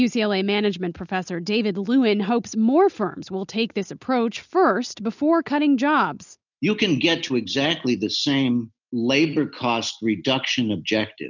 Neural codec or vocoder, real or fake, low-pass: none; real; 7.2 kHz